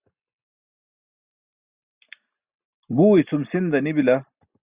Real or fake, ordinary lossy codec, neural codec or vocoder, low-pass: real; Opus, 64 kbps; none; 3.6 kHz